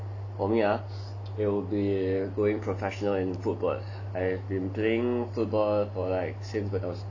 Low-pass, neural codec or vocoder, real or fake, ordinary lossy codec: 7.2 kHz; none; real; none